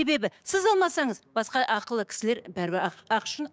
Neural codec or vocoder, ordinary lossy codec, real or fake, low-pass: codec, 16 kHz, 6 kbps, DAC; none; fake; none